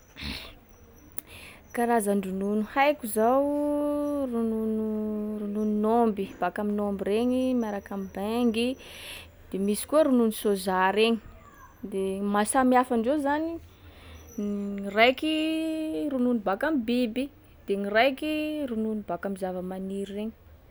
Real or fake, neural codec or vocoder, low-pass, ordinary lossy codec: real; none; none; none